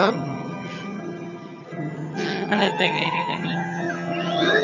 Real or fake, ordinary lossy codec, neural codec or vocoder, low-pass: fake; none; vocoder, 22.05 kHz, 80 mel bands, HiFi-GAN; 7.2 kHz